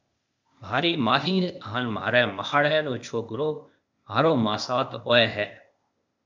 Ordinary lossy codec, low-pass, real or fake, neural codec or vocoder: MP3, 64 kbps; 7.2 kHz; fake; codec, 16 kHz, 0.8 kbps, ZipCodec